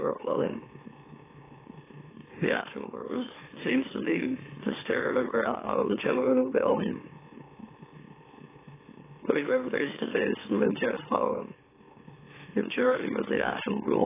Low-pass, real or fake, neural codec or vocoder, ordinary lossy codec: 3.6 kHz; fake; autoencoder, 44.1 kHz, a latent of 192 numbers a frame, MeloTTS; AAC, 16 kbps